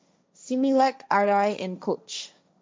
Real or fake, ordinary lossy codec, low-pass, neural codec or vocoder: fake; none; none; codec, 16 kHz, 1.1 kbps, Voila-Tokenizer